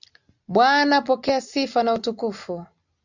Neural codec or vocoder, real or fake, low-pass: none; real; 7.2 kHz